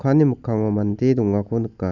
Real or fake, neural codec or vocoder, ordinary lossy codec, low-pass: real; none; none; 7.2 kHz